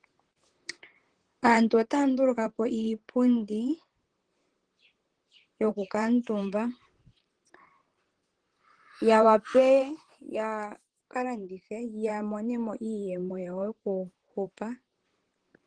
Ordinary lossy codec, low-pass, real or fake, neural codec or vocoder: Opus, 16 kbps; 9.9 kHz; fake; vocoder, 44.1 kHz, 128 mel bands, Pupu-Vocoder